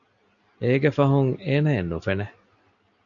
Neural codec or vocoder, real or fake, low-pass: none; real; 7.2 kHz